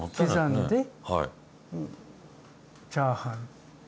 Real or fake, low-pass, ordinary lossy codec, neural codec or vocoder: real; none; none; none